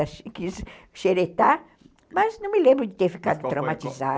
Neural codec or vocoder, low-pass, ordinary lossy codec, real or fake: none; none; none; real